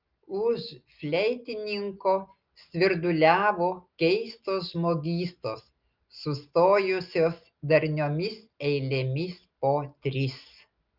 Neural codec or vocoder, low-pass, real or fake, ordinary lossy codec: none; 5.4 kHz; real; Opus, 24 kbps